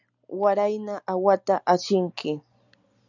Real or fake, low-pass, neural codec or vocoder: real; 7.2 kHz; none